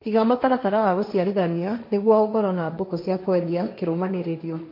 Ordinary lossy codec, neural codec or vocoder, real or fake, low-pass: MP3, 32 kbps; codec, 16 kHz, 1.1 kbps, Voila-Tokenizer; fake; 5.4 kHz